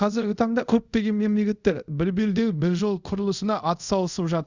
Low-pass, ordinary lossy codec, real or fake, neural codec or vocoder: 7.2 kHz; Opus, 64 kbps; fake; codec, 24 kHz, 0.5 kbps, DualCodec